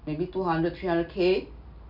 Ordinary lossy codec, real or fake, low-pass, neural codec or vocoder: none; real; 5.4 kHz; none